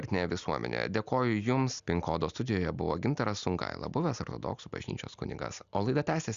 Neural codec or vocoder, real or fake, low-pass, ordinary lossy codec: none; real; 7.2 kHz; Opus, 64 kbps